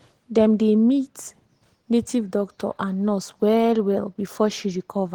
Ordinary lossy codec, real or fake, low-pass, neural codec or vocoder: Opus, 16 kbps; real; 19.8 kHz; none